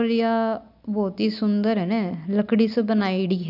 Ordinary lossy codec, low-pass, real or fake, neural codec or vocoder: AAC, 48 kbps; 5.4 kHz; real; none